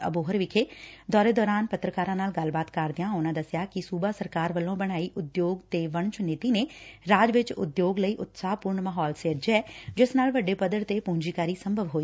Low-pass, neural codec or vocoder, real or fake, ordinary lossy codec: none; none; real; none